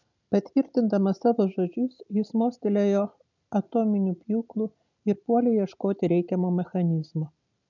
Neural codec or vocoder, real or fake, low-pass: none; real; 7.2 kHz